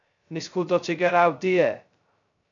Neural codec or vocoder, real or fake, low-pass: codec, 16 kHz, 0.2 kbps, FocalCodec; fake; 7.2 kHz